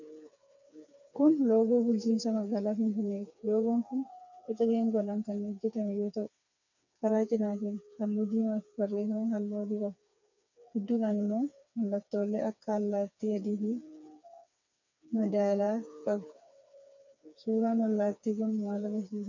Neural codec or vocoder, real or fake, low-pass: codec, 16 kHz, 4 kbps, FreqCodec, smaller model; fake; 7.2 kHz